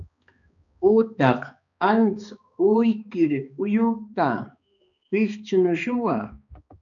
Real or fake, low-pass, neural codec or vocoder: fake; 7.2 kHz; codec, 16 kHz, 2 kbps, X-Codec, HuBERT features, trained on balanced general audio